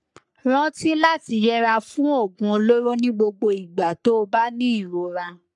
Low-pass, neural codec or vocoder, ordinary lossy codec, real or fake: 10.8 kHz; codec, 44.1 kHz, 3.4 kbps, Pupu-Codec; none; fake